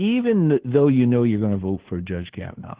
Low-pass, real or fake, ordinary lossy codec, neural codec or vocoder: 3.6 kHz; fake; Opus, 16 kbps; codec, 16 kHz, 2 kbps, FunCodec, trained on Chinese and English, 25 frames a second